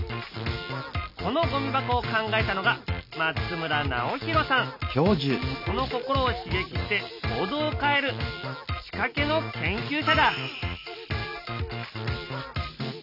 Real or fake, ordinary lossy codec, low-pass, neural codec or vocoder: real; none; 5.4 kHz; none